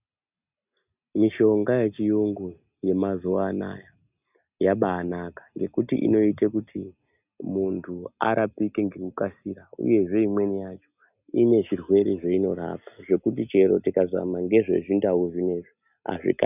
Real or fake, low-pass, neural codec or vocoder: real; 3.6 kHz; none